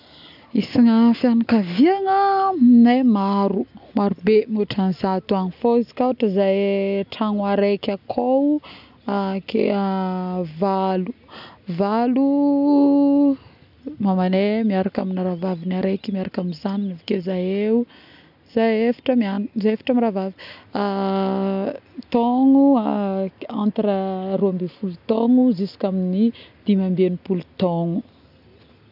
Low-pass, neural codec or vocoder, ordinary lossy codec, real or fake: 5.4 kHz; none; none; real